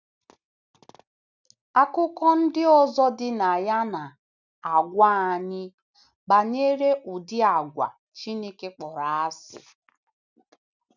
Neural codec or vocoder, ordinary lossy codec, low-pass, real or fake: none; none; 7.2 kHz; real